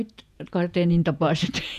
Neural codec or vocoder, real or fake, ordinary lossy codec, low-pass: vocoder, 48 kHz, 128 mel bands, Vocos; fake; none; 14.4 kHz